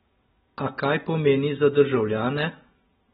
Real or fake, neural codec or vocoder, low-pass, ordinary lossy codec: real; none; 19.8 kHz; AAC, 16 kbps